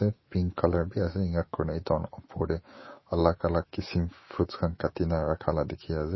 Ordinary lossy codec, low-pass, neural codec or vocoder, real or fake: MP3, 24 kbps; 7.2 kHz; autoencoder, 48 kHz, 128 numbers a frame, DAC-VAE, trained on Japanese speech; fake